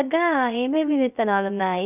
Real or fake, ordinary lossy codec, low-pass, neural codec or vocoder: fake; none; 3.6 kHz; codec, 16 kHz, 0.3 kbps, FocalCodec